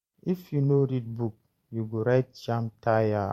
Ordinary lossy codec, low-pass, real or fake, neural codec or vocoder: MP3, 64 kbps; 19.8 kHz; fake; vocoder, 44.1 kHz, 128 mel bands every 512 samples, BigVGAN v2